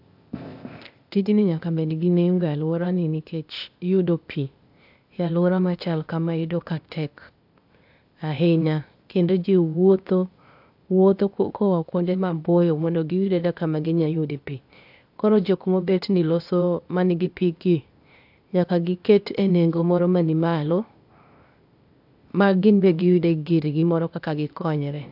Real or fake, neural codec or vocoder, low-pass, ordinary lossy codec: fake; codec, 16 kHz, 0.8 kbps, ZipCodec; 5.4 kHz; AAC, 48 kbps